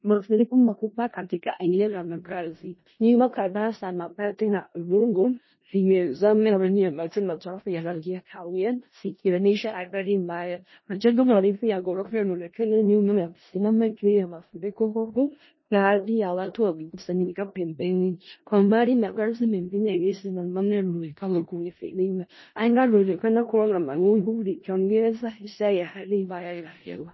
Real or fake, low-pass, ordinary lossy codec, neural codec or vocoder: fake; 7.2 kHz; MP3, 24 kbps; codec, 16 kHz in and 24 kHz out, 0.4 kbps, LongCat-Audio-Codec, four codebook decoder